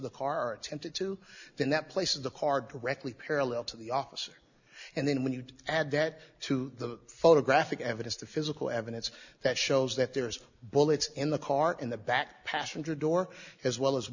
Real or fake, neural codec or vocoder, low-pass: real; none; 7.2 kHz